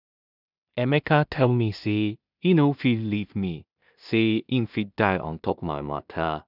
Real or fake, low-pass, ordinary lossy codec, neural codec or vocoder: fake; 5.4 kHz; none; codec, 16 kHz in and 24 kHz out, 0.4 kbps, LongCat-Audio-Codec, two codebook decoder